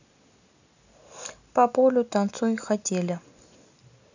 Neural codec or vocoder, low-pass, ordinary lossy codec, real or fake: none; 7.2 kHz; none; real